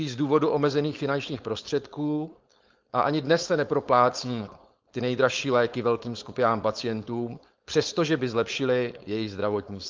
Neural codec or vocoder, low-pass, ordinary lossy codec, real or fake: codec, 16 kHz, 4.8 kbps, FACodec; 7.2 kHz; Opus, 32 kbps; fake